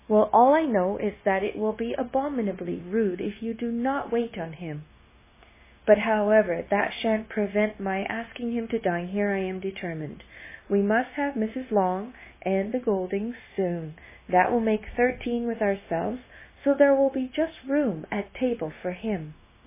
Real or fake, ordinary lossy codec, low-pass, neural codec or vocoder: fake; MP3, 16 kbps; 3.6 kHz; codec, 16 kHz, 0.9 kbps, LongCat-Audio-Codec